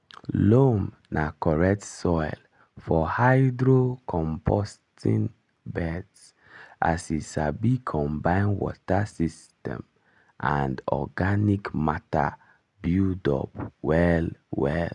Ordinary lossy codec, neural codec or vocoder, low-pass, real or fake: none; none; 10.8 kHz; real